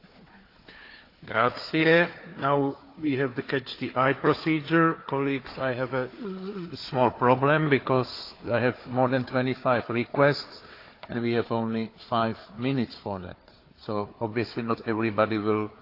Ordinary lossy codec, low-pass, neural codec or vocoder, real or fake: AAC, 32 kbps; 5.4 kHz; codec, 16 kHz, 4 kbps, FunCodec, trained on Chinese and English, 50 frames a second; fake